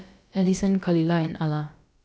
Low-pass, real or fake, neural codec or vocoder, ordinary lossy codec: none; fake; codec, 16 kHz, about 1 kbps, DyCAST, with the encoder's durations; none